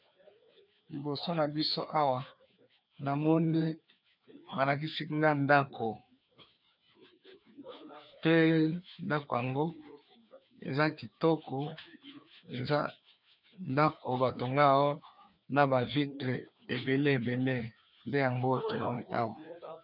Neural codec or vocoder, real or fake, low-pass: codec, 16 kHz, 2 kbps, FreqCodec, larger model; fake; 5.4 kHz